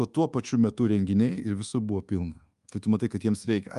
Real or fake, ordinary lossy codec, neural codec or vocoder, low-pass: fake; Opus, 32 kbps; codec, 24 kHz, 1.2 kbps, DualCodec; 10.8 kHz